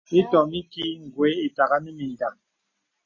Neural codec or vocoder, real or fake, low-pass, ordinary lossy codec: none; real; 7.2 kHz; MP3, 32 kbps